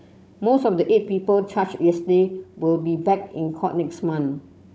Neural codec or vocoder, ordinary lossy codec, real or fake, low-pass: codec, 16 kHz, 16 kbps, FunCodec, trained on Chinese and English, 50 frames a second; none; fake; none